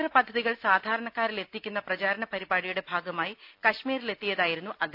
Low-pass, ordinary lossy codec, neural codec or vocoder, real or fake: 5.4 kHz; none; none; real